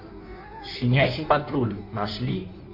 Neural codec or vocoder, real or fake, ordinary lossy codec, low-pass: codec, 16 kHz in and 24 kHz out, 1.1 kbps, FireRedTTS-2 codec; fake; MP3, 48 kbps; 5.4 kHz